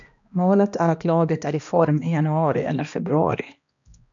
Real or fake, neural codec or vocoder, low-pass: fake; codec, 16 kHz, 1 kbps, X-Codec, HuBERT features, trained on balanced general audio; 7.2 kHz